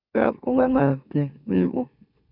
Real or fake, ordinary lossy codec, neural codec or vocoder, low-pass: fake; none; autoencoder, 44.1 kHz, a latent of 192 numbers a frame, MeloTTS; 5.4 kHz